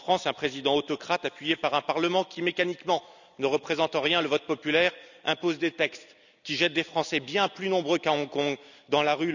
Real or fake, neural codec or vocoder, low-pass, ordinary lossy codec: real; none; 7.2 kHz; none